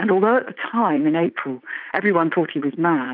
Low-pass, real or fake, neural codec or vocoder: 5.4 kHz; fake; vocoder, 44.1 kHz, 80 mel bands, Vocos